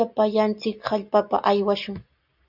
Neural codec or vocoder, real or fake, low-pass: none; real; 5.4 kHz